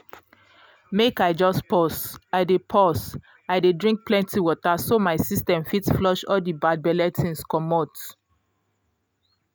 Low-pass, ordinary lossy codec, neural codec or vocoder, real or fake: none; none; none; real